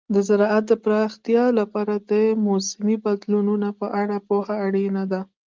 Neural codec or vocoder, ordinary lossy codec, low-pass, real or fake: none; Opus, 24 kbps; 7.2 kHz; real